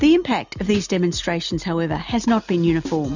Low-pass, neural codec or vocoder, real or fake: 7.2 kHz; none; real